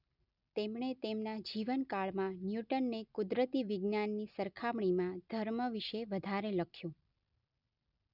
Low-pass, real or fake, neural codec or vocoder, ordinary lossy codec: 5.4 kHz; real; none; none